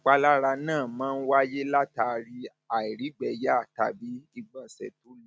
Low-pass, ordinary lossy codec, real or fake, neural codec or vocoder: none; none; real; none